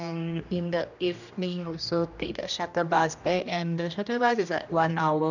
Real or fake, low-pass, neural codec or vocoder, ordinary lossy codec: fake; 7.2 kHz; codec, 16 kHz, 1 kbps, X-Codec, HuBERT features, trained on general audio; none